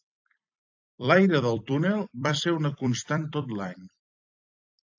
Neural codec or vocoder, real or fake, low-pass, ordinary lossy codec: vocoder, 44.1 kHz, 128 mel bands every 256 samples, BigVGAN v2; fake; 7.2 kHz; AAC, 48 kbps